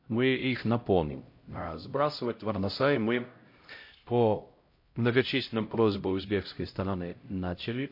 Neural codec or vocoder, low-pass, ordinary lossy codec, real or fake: codec, 16 kHz, 0.5 kbps, X-Codec, HuBERT features, trained on LibriSpeech; 5.4 kHz; MP3, 32 kbps; fake